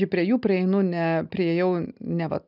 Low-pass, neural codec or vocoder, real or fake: 5.4 kHz; none; real